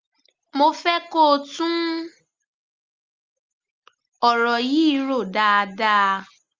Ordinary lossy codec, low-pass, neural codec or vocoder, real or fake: Opus, 32 kbps; 7.2 kHz; none; real